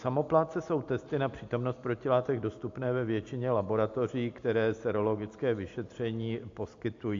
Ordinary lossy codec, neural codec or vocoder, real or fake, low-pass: AAC, 48 kbps; none; real; 7.2 kHz